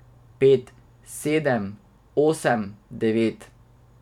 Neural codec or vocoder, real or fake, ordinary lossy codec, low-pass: vocoder, 44.1 kHz, 128 mel bands every 512 samples, BigVGAN v2; fake; none; 19.8 kHz